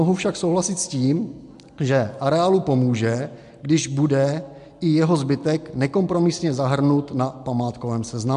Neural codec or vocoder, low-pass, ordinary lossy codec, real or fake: none; 10.8 kHz; MP3, 64 kbps; real